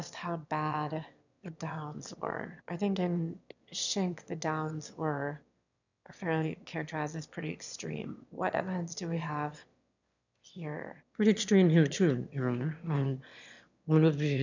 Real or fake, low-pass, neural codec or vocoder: fake; 7.2 kHz; autoencoder, 22.05 kHz, a latent of 192 numbers a frame, VITS, trained on one speaker